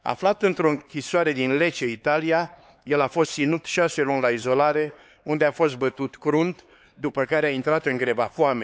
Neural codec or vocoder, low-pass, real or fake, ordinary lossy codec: codec, 16 kHz, 4 kbps, X-Codec, HuBERT features, trained on LibriSpeech; none; fake; none